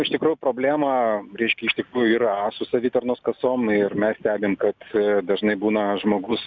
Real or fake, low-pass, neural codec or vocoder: real; 7.2 kHz; none